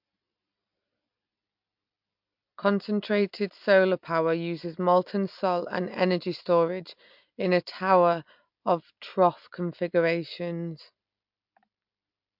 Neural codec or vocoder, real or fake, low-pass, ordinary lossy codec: none; real; 5.4 kHz; MP3, 48 kbps